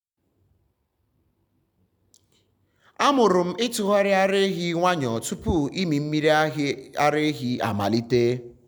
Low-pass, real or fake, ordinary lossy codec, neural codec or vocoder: none; real; none; none